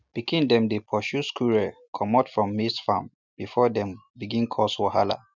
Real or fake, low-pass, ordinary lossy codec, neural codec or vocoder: real; 7.2 kHz; none; none